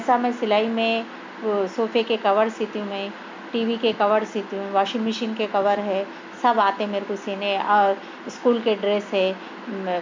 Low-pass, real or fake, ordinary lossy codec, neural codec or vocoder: 7.2 kHz; real; MP3, 64 kbps; none